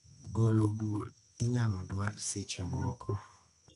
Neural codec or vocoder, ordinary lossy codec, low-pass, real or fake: codec, 24 kHz, 0.9 kbps, WavTokenizer, medium music audio release; none; 10.8 kHz; fake